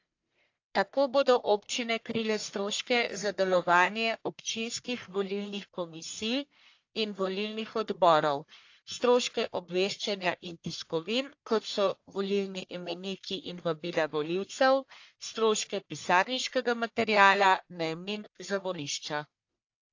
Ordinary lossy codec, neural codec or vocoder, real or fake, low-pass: AAC, 48 kbps; codec, 44.1 kHz, 1.7 kbps, Pupu-Codec; fake; 7.2 kHz